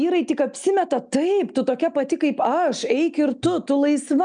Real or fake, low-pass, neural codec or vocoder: real; 9.9 kHz; none